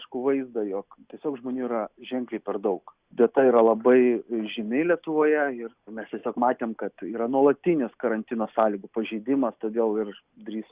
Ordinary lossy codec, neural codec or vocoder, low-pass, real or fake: Opus, 64 kbps; none; 3.6 kHz; real